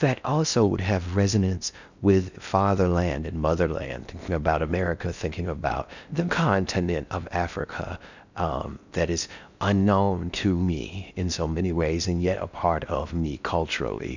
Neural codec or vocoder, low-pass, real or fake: codec, 16 kHz in and 24 kHz out, 0.6 kbps, FocalCodec, streaming, 2048 codes; 7.2 kHz; fake